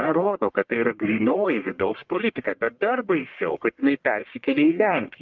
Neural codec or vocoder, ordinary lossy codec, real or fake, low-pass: codec, 44.1 kHz, 1.7 kbps, Pupu-Codec; Opus, 24 kbps; fake; 7.2 kHz